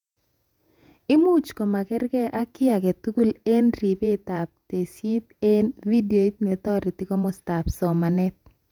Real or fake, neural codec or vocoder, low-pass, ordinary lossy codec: fake; vocoder, 48 kHz, 128 mel bands, Vocos; 19.8 kHz; none